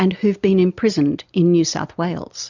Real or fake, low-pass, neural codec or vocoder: real; 7.2 kHz; none